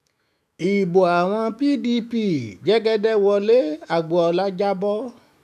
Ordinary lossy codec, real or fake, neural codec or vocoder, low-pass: none; fake; autoencoder, 48 kHz, 128 numbers a frame, DAC-VAE, trained on Japanese speech; 14.4 kHz